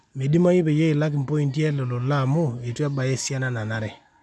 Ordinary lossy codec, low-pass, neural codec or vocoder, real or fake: none; none; none; real